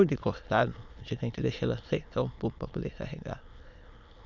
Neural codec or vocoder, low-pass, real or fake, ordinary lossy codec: autoencoder, 22.05 kHz, a latent of 192 numbers a frame, VITS, trained on many speakers; 7.2 kHz; fake; none